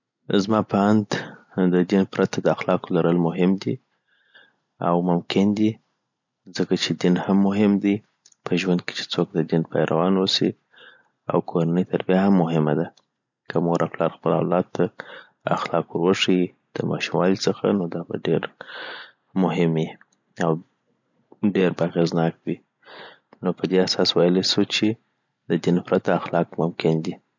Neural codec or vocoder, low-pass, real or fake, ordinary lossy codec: none; 7.2 kHz; real; none